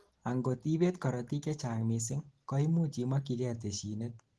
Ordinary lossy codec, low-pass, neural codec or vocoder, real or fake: Opus, 16 kbps; 10.8 kHz; none; real